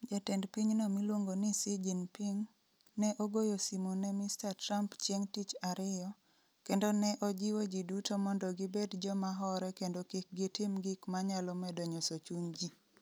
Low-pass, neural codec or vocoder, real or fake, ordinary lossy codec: none; none; real; none